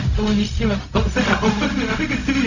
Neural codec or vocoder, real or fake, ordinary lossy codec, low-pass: codec, 16 kHz, 0.4 kbps, LongCat-Audio-Codec; fake; none; 7.2 kHz